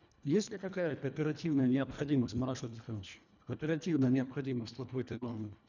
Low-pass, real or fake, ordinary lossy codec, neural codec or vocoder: 7.2 kHz; fake; none; codec, 24 kHz, 1.5 kbps, HILCodec